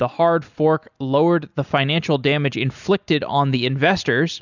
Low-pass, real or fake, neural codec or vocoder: 7.2 kHz; real; none